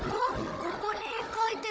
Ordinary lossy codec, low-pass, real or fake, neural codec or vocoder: none; none; fake; codec, 16 kHz, 16 kbps, FunCodec, trained on Chinese and English, 50 frames a second